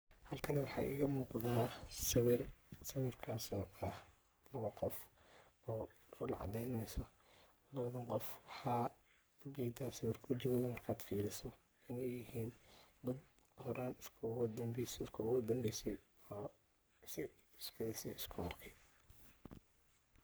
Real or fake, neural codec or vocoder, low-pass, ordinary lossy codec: fake; codec, 44.1 kHz, 3.4 kbps, Pupu-Codec; none; none